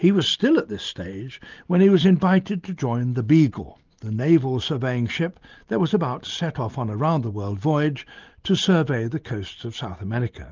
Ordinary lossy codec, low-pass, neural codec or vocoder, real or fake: Opus, 24 kbps; 7.2 kHz; none; real